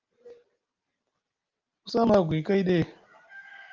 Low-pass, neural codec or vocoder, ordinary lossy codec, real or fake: 7.2 kHz; none; Opus, 24 kbps; real